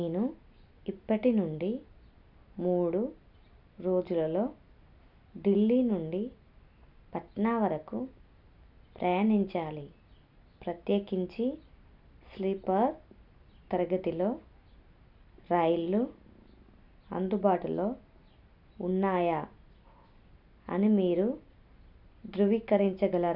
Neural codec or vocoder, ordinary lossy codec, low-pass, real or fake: none; none; 5.4 kHz; real